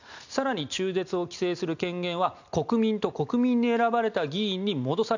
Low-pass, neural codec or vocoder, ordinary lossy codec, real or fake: 7.2 kHz; none; none; real